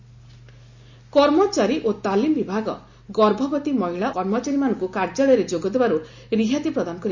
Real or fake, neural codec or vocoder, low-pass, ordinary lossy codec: real; none; 7.2 kHz; Opus, 64 kbps